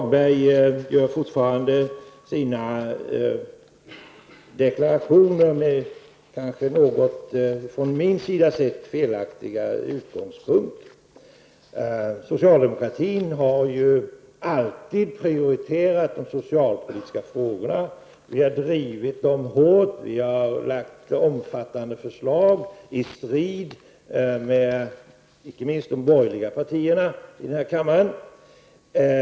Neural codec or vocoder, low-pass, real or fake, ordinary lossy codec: none; none; real; none